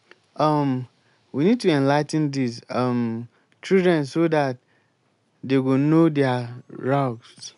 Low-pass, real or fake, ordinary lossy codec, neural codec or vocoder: 10.8 kHz; real; none; none